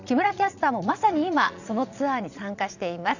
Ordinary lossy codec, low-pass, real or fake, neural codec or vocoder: none; 7.2 kHz; fake; vocoder, 22.05 kHz, 80 mel bands, Vocos